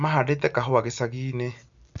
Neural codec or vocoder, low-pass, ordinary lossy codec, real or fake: none; 7.2 kHz; none; real